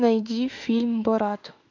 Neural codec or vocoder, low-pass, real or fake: autoencoder, 48 kHz, 32 numbers a frame, DAC-VAE, trained on Japanese speech; 7.2 kHz; fake